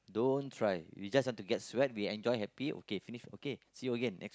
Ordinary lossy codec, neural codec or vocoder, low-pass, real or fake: none; none; none; real